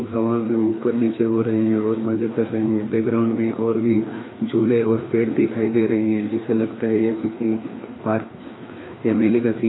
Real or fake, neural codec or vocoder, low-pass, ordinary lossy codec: fake; codec, 16 kHz, 2 kbps, FreqCodec, larger model; 7.2 kHz; AAC, 16 kbps